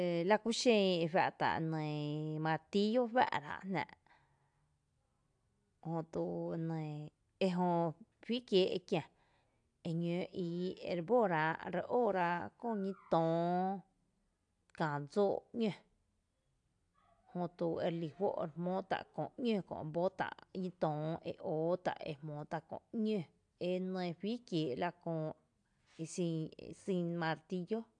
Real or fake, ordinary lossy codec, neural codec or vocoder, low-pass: real; AAC, 64 kbps; none; 9.9 kHz